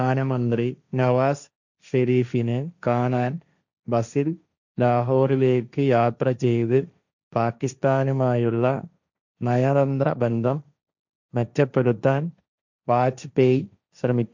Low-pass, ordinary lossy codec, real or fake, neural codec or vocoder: 7.2 kHz; none; fake; codec, 16 kHz, 1.1 kbps, Voila-Tokenizer